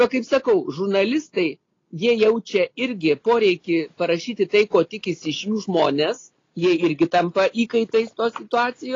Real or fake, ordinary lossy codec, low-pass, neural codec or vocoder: real; AAC, 32 kbps; 7.2 kHz; none